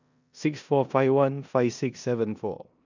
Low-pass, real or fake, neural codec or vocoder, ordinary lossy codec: 7.2 kHz; fake; codec, 16 kHz in and 24 kHz out, 0.9 kbps, LongCat-Audio-Codec, four codebook decoder; none